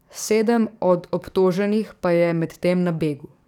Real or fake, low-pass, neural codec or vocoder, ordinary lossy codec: fake; 19.8 kHz; codec, 44.1 kHz, 7.8 kbps, DAC; none